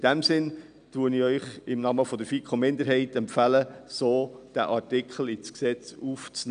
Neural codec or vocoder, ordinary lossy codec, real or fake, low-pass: none; none; real; 9.9 kHz